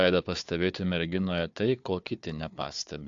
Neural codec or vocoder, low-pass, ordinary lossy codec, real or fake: codec, 16 kHz, 4 kbps, FunCodec, trained on Chinese and English, 50 frames a second; 7.2 kHz; AAC, 64 kbps; fake